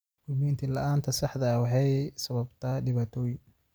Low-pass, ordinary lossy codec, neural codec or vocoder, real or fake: none; none; none; real